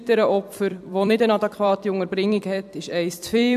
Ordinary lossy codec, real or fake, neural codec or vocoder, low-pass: AAC, 96 kbps; fake; vocoder, 44.1 kHz, 128 mel bands every 256 samples, BigVGAN v2; 14.4 kHz